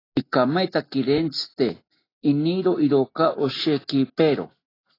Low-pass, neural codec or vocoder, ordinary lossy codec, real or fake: 5.4 kHz; none; AAC, 24 kbps; real